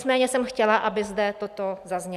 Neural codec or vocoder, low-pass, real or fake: none; 14.4 kHz; real